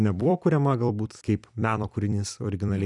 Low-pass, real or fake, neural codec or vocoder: 10.8 kHz; fake; vocoder, 44.1 kHz, 128 mel bands every 256 samples, BigVGAN v2